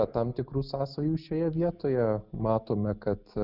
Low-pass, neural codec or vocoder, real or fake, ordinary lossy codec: 5.4 kHz; none; real; Opus, 64 kbps